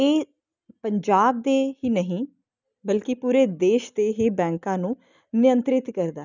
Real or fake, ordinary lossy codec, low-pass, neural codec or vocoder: real; none; 7.2 kHz; none